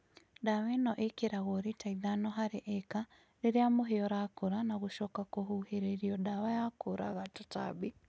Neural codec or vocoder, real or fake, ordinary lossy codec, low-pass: none; real; none; none